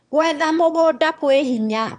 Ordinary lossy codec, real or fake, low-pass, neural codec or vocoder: none; fake; 9.9 kHz; autoencoder, 22.05 kHz, a latent of 192 numbers a frame, VITS, trained on one speaker